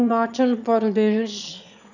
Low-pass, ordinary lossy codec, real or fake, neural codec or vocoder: 7.2 kHz; none; fake; autoencoder, 22.05 kHz, a latent of 192 numbers a frame, VITS, trained on one speaker